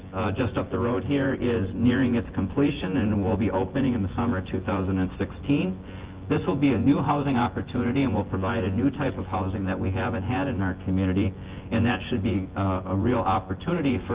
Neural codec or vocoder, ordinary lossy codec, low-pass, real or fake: vocoder, 24 kHz, 100 mel bands, Vocos; Opus, 16 kbps; 3.6 kHz; fake